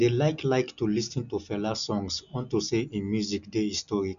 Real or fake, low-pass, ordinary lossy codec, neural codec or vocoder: real; 7.2 kHz; none; none